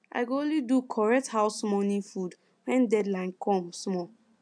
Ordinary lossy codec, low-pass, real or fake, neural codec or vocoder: AAC, 64 kbps; 9.9 kHz; real; none